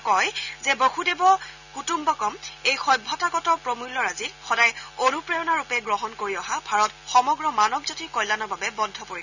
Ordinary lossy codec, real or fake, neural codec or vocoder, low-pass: none; real; none; 7.2 kHz